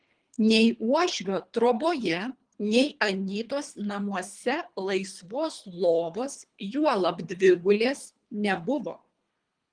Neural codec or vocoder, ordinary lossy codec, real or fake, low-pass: codec, 24 kHz, 3 kbps, HILCodec; Opus, 24 kbps; fake; 9.9 kHz